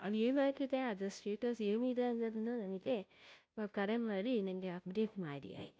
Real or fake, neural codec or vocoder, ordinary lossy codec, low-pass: fake; codec, 16 kHz, 0.5 kbps, FunCodec, trained on Chinese and English, 25 frames a second; none; none